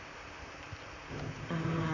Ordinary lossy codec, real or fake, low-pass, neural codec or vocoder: Opus, 64 kbps; fake; 7.2 kHz; vocoder, 44.1 kHz, 128 mel bands, Pupu-Vocoder